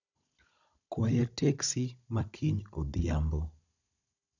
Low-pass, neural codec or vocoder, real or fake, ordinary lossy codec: 7.2 kHz; codec, 16 kHz, 16 kbps, FunCodec, trained on Chinese and English, 50 frames a second; fake; none